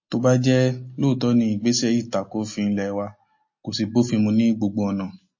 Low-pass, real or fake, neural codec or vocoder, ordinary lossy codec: 7.2 kHz; real; none; MP3, 32 kbps